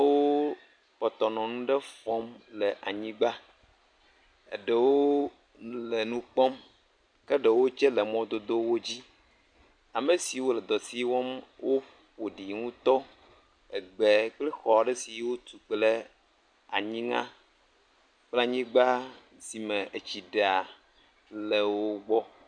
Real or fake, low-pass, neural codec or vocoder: real; 9.9 kHz; none